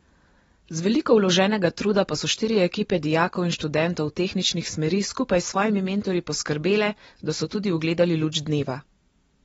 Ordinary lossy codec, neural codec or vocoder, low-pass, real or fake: AAC, 24 kbps; none; 19.8 kHz; real